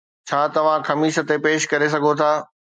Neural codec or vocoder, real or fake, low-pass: none; real; 9.9 kHz